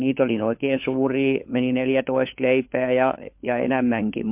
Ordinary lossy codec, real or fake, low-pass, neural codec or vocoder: MP3, 32 kbps; fake; 3.6 kHz; codec, 16 kHz, 4 kbps, FunCodec, trained on LibriTTS, 50 frames a second